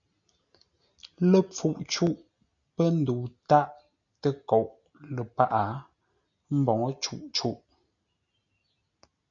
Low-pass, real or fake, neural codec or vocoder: 7.2 kHz; real; none